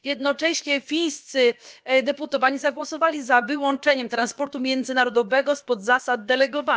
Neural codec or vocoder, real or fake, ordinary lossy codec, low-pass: codec, 16 kHz, about 1 kbps, DyCAST, with the encoder's durations; fake; none; none